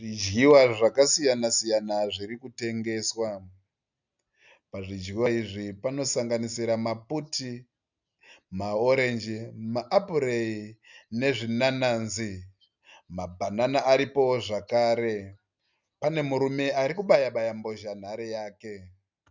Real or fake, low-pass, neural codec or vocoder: real; 7.2 kHz; none